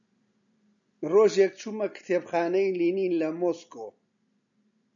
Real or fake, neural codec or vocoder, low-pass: real; none; 7.2 kHz